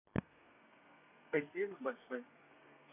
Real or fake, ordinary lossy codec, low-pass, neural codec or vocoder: fake; none; 3.6 kHz; codec, 32 kHz, 1.9 kbps, SNAC